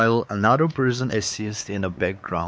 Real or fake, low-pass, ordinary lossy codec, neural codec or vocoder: fake; none; none; codec, 16 kHz, 4 kbps, X-Codec, WavLM features, trained on Multilingual LibriSpeech